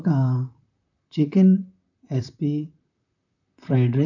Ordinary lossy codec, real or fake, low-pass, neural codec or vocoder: none; fake; 7.2 kHz; vocoder, 44.1 kHz, 128 mel bands, Pupu-Vocoder